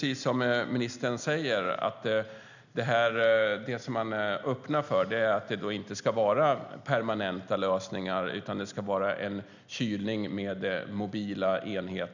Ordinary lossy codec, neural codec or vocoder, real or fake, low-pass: none; none; real; 7.2 kHz